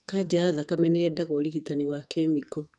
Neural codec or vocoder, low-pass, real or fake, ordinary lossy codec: codec, 44.1 kHz, 2.6 kbps, SNAC; 10.8 kHz; fake; none